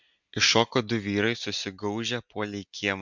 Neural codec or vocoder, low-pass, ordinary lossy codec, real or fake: none; 7.2 kHz; MP3, 64 kbps; real